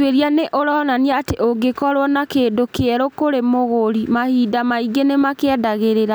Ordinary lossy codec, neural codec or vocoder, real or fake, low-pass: none; none; real; none